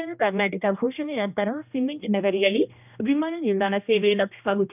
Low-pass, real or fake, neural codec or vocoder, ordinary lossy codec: 3.6 kHz; fake; codec, 16 kHz, 1 kbps, X-Codec, HuBERT features, trained on general audio; none